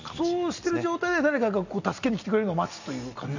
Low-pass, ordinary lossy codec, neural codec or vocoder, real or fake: 7.2 kHz; none; none; real